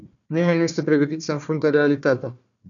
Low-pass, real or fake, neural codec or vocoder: 7.2 kHz; fake; codec, 16 kHz, 1 kbps, FunCodec, trained on Chinese and English, 50 frames a second